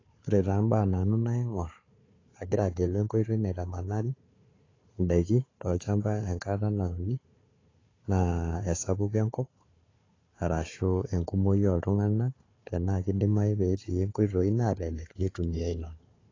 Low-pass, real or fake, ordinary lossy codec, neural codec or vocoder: 7.2 kHz; fake; AAC, 32 kbps; codec, 16 kHz, 4 kbps, FunCodec, trained on Chinese and English, 50 frames a second